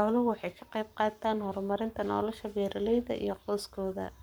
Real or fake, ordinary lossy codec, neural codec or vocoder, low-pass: fake; none; codec, 44.1 kHz, 7.8 kbps, Pupu-Codec; none